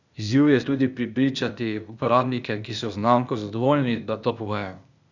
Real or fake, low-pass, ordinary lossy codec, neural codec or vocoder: fake; 7.2 kHz; none; codec, 16 kHz, 0.8 kbps, ZipCodec